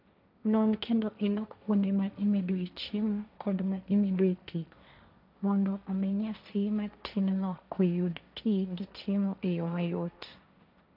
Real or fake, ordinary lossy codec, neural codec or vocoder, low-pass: fake; none; codec, 16 kHz, 1.1 kbps, Voila-Tokenizer; 5.4 kHz